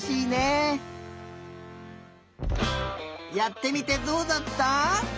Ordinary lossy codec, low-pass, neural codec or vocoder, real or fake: none; none; none; real